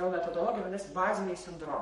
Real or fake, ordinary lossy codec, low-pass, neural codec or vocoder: fake; MP3, 48 kbps; 19.8 kHz; codec, 44.1 kHz, 7.8 kbps, Pupu-Codec